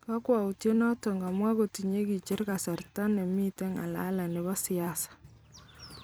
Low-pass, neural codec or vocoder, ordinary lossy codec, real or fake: none; vocoder, 44.1 kHz, 128 mel bands every 256 samples, BigVGAN v2; none; fake